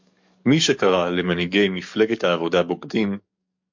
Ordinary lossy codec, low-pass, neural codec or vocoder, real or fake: MP3, 48 kbps; 7.2 kHz; codec, 44.1 kHz, 7.8 kbps, DAC; fake